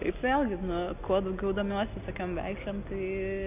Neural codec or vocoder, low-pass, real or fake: none; 3.6 kHz; real